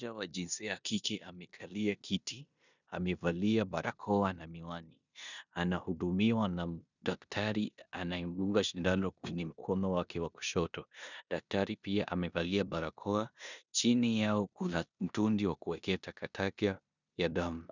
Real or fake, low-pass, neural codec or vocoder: fake; 7.2 kHz; codec, 16 kHz in and 24 kHz out, 0.9 kbps, LongCat-Audio-Codec, four codebook decoder